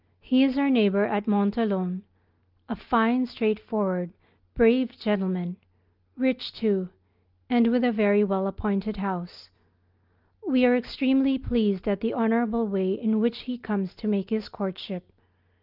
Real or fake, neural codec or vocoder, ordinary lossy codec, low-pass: real; none; Opus, 32 kbps; 5.4 kHz